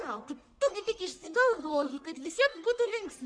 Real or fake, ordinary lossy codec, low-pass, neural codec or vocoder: fake; MP3, 64 kbps; 9.9 kHz; codec, 44.1 kHz, 1.7 kbps, Pupu-Codec